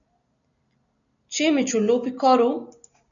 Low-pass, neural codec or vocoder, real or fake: 7.2 kHz; none; real